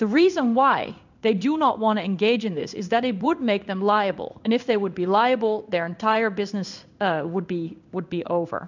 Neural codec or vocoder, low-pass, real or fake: codec, 16 kHz in and 24 kHz out, 1 kbps, XY-Tokenizer; 7.2 kHz; fake